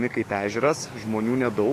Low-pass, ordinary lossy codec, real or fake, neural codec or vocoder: 14.4 kHz; AAC, 48 kbps; fake; codec, 44.1 kHz, 7.8 kbps, DAC